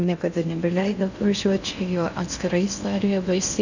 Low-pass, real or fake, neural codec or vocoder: 7.2 kHz; fake; codec, 16 kHz in and 24 kHz out, 0.6 kbps, FocalCodec, streaming, 2048 codes